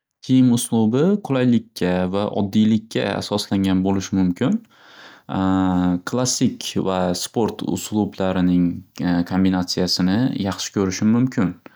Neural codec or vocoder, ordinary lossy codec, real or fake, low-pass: none; none; real; none